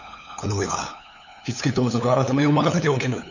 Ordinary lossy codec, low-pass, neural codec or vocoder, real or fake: none; 7.2 kHz; codec, 16 kHz, 8 kbps, FunCodec, trained on LibriTTS, 25 frames a second; fake